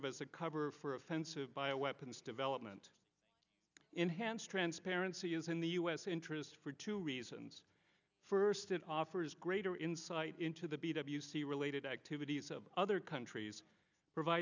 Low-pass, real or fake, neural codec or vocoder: 7.2 kHz; real; none